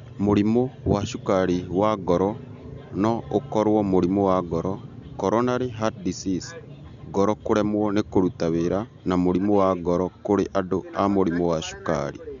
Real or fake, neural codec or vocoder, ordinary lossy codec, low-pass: real; none; MP3, 96 kbps; 7.2 kHz